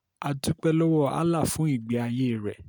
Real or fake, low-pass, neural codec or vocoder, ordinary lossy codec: real; none; none; none